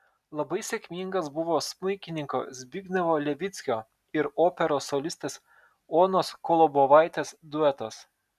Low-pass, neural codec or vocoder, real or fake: 14.4 kHz; none; real